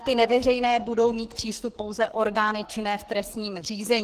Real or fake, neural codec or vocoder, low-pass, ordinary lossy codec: fake; codec, 44.1 kHz, 2.6 kbps, SNAC; 14.4 kHz; Opus, 16 kbps